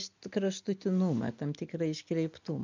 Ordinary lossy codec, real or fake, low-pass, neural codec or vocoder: MP3, 64 kbps; real; 7.2 kHz; none